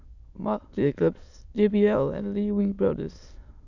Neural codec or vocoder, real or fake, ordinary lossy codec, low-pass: autoencoder, 22.05 kHz, a latent of 192 numbers a frame, VITS, trained on many speakers; fake; none; 7.2 kHz